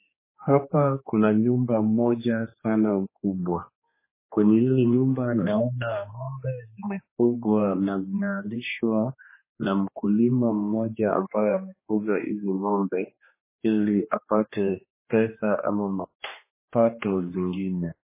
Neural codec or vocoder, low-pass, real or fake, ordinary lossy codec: codec, 16 kHz, 2 kbps, X-Codec, HuBERT features, trained on general audio; 3.6 kHz; fake; MP3, 16 kbps